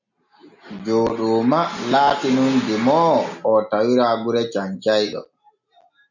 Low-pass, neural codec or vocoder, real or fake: 7.2 kHz; none; real